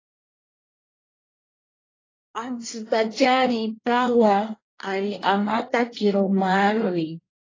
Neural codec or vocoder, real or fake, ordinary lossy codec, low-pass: codec, 24 kHz, 1 kbps, SNAC; fake; AAC, 32 kbps; 7.2 kHz